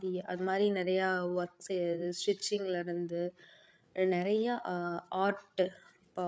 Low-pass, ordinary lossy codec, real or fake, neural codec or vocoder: none; none; fake; codec, 16 kHz, 8 kbps, FreqCodec, larger model